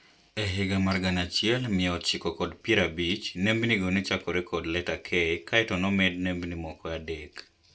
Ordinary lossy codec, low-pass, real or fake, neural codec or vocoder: none; none; real; none